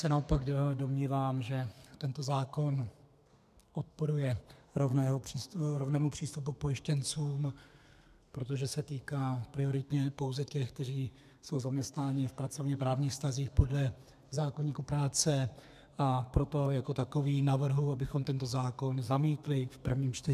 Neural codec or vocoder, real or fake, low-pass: codec, 32 kHz, 1.9 kbps, SNAC; fake; 14.4 kHz